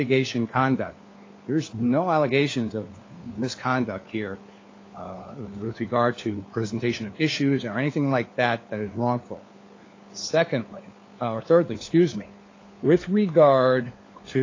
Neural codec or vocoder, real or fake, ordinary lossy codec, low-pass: codec, 16 kHz, 4 kbps, FunCodec, trained on LibriTTS, 50 frames a second; fake; AAC, 32 kbps; 7.2 kHz